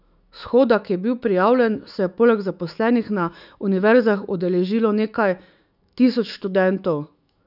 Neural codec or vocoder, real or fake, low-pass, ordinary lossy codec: autoencoder, 48 kHz, 128 numbers a frame, DAC-VAE, trained on Japanese speech; fake; 5.4 kHz; none